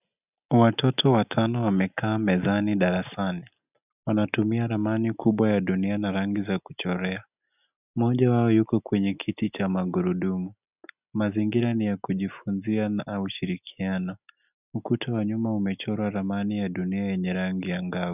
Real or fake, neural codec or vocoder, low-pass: real; none; 3.6 kHz